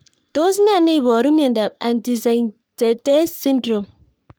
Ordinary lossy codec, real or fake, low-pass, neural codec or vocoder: none; fake; none; codec, 44.1 kHz, 3.4 kbps, Pupu-Codec